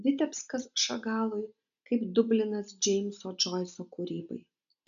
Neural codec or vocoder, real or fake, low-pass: none; real; 7.2 kHz